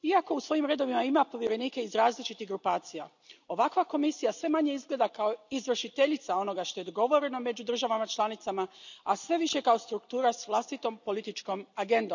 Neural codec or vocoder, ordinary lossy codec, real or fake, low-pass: none; none; real; 7.2 kHz